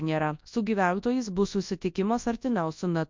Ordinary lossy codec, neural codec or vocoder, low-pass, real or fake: MP3, 48 kbps; codec, 24 kHz, 0.9 kbps, WavTokenizer, large speech release; 7.2 kHz; fake